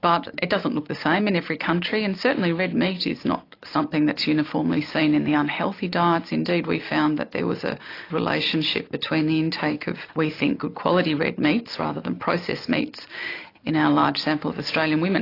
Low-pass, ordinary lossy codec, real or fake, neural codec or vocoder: 5.4 kHz; AAC, 32 kbps; real; none